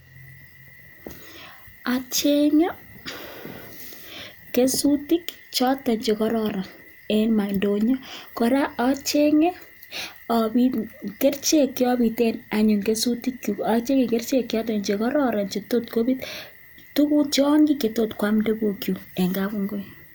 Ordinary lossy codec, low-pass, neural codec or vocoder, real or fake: none; none; none; real